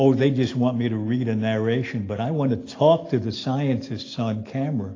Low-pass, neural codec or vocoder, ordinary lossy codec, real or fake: 7.2 kHz; none; AAC, 32 kbps; real